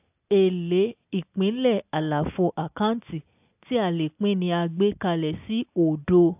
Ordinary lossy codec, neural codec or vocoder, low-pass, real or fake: AAC, 32 kbps; none; 3.6 kHz; real